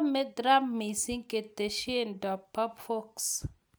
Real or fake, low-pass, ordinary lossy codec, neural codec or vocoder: real; none; none; none